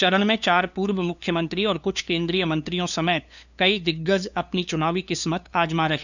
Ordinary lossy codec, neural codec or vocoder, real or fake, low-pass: none; codec, 16 kHz, 2 kbps, FunCodec, trained on LibriTTS, 25 frames a second; fake; 7.2 kHz